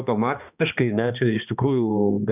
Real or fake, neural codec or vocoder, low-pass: fake; codec, 16 kHz, 2 kbps, X-Codec, HuBERT features, trained on balanced general audio; 3.6 kHz